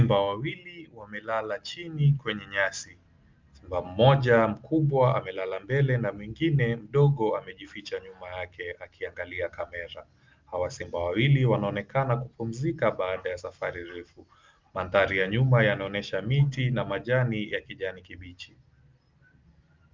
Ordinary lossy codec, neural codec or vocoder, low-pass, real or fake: Opus, 24 kbps; none; 7.2 kHz; real